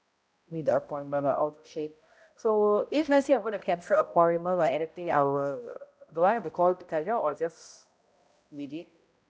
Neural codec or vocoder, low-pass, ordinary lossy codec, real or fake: codec, 16 kHz, 0.5 kbps, X-Codec, HuBERT features, trained on balanced general audio; none; none; fake